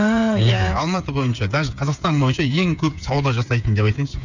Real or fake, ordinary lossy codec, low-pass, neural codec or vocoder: fake; none; 7.2 kHz; codec, 16 kHz, 8 kbps, FreqCodec, smaller model